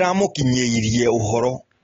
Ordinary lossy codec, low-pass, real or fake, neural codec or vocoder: AAC, 24 kbps; 7.2 kHz; real; none